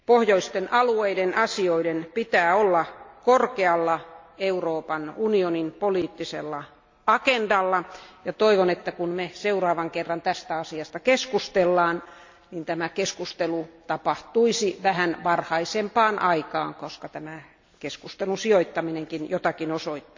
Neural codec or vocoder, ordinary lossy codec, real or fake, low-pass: none; MP3, 48 kbps; real; 7.2 kHz